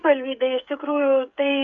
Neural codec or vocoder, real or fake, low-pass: codec, 16 kHz, 16 kbps, FreqCodec, smaller model; fake; 7.2 kHz